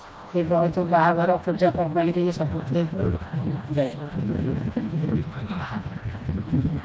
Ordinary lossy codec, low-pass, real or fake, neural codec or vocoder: none; none; fake; codec, 16 kHz, 1 kbps, FreqCodec, smaller model